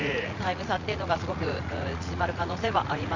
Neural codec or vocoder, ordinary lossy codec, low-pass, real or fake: vocoder, 44.1 kHz, 80 mel bands, Vocos; none; 7.2 kHz; fake